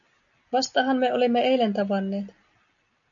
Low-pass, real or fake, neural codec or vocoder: 7.2 kHz; real; none